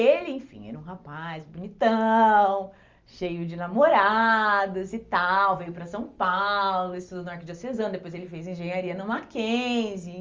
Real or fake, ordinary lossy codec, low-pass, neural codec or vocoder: real; Opus, 32 kbps; 7.2 kHz; none